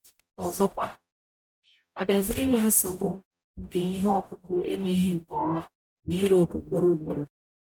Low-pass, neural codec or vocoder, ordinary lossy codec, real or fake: 19.8 kHz; codec, 44.1 kHz, 0.9 kbps, DAC; none; fake